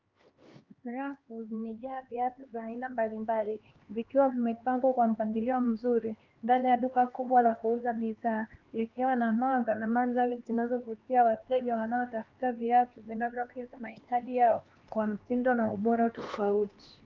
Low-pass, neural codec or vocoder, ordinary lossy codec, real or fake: 7.2 kHz; codec, 16 kHz, 2 kbps, X-Codec, HuBERT features, trained on LibriSpeech; Opus, 24 kbps; fake